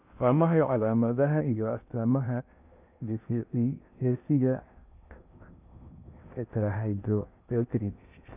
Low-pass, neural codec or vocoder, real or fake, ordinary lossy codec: 3.6 kHz; codec, 16 kHz in and 24 kHz out, 0.6 kbps, FocalCodec, streaming, 2048 codes; fake; none